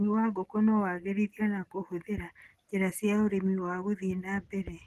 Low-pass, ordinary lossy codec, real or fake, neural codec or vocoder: 14.4 kHz; Opus, 16 kbps; real; none